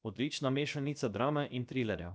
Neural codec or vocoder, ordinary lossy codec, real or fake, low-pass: codec, 16 kHz, about 1 kbps, DyCAST, with the encoder's durations; none; fake; none